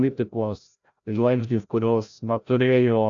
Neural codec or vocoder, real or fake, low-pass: codec, 16 kHz, 0.5 kbps, FreqCodec, larger model; fake; 7.2 kHz